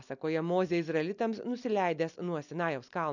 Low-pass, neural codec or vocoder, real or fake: 7.2 kHz; none; real